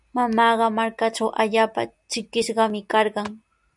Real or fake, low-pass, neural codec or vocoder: real; 10.8 kHz; none